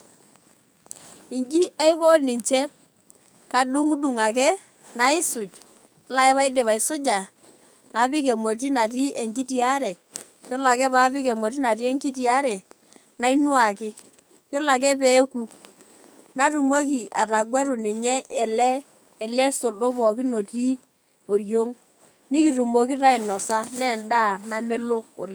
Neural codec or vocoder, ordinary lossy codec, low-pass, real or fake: codec, 44.1 kHz, 2.6 kbps, SNAC; none; none; fake